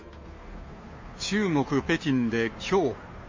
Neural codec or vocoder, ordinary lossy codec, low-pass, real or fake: codec, 16 kHz, 2 kbps, FunCodec, trained on Chinese and English, 25 frames a second; MP3, 32 kbps; 7.2 kHz; fake